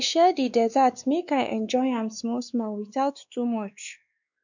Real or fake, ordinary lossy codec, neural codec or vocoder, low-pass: fake; none; codec, 16 kHz, 2 kbps, X-Codec, WavLM features, trained on Multilingual LibriSpeech; 7.2 kHz